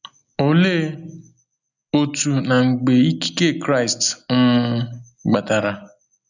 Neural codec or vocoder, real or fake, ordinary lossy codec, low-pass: none; real; none; 7.2 kHz